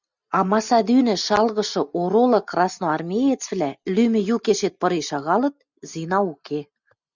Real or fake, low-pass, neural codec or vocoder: real; 7.2 kHz; none